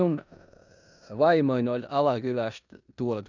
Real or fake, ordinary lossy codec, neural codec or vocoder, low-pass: fake; none; codec, 16 kHz in and 24 kHz out, 0.9 kbps, LongCat-Audio-Codec, four codebook decoder; 7.2 kHz